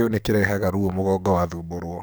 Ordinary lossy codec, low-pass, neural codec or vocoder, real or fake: none; none; codec, 44.1 kHz, 7.8 kbps, DAC; fake